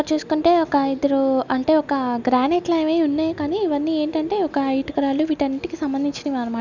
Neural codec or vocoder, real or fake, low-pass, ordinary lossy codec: none; real; 7.2 kHz; none